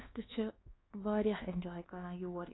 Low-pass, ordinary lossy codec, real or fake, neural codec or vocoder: 7.2 kHz; AAC, 16 kbps; fake; codec, 24 kHz, 1.2 kbps, DualCodec